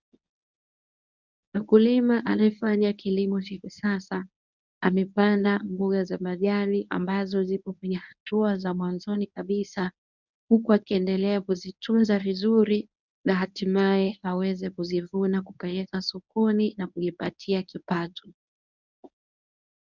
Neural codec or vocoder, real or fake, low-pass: codec, 24 kHz, 0.9 kbps, WavTokenizer, medium speech release version 1; fake; 7.2 kHz